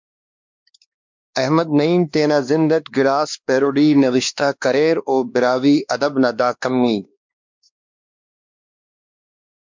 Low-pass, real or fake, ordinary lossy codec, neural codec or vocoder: 7.2 kHz; fake; MP3, 64 kbps; codec, 16 kHz, 2 kbps, X-Codec, WavLM features, trained on Multilingual LibriSpeech